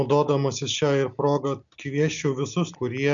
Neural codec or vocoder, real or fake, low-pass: none; real; 7.2 kHz